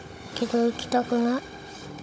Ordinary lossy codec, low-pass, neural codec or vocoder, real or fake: none; none; codec, 16 kHz, 16 kbps, FunCodec, trained on Chinese and English, 50 frames a second; fake